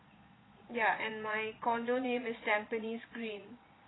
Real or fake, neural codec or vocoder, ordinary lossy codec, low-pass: fake; vocoder, 22.05 kHz, 80 mel bands, Vocos; AAC, 16 kbps; 7.2 kHz